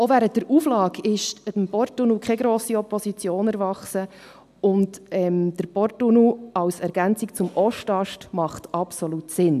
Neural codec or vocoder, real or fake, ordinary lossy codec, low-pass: none; real; AAC, 96 kbps; 14.4 kHz